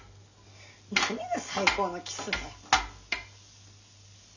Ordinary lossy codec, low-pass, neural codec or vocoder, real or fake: none; 7.2 kHz; none; real